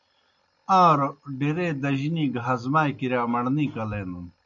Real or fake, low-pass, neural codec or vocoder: real; 7.2 kHz; none